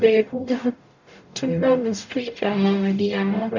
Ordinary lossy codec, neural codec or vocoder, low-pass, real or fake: none; codec, 44.1 kHz, 0.9 kbps, DAC; 7.2 kHz; fake